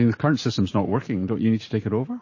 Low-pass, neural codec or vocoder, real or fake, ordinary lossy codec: 7.2 kHz; vocoder, 44.1 kHz, 128 mel bands every 512 samples, BigVGAN v2; fake; MP3, 32 kbps